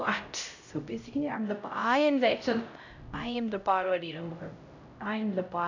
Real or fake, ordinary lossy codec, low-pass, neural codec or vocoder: fake; none; 7.2 kHz; codec, 16 kHz, 0.5 kbps, X-Codec, HuBERT features, trained on LibriSpeech